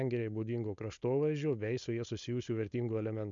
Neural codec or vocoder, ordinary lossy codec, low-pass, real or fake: codec, 16 kHz, 4.8 kbps, FACodec; MP3, 64 kbps; 7.2 kHz; fake